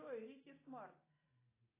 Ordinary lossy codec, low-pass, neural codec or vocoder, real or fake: AAC, 24 kbps; 3.6 kHz; none; real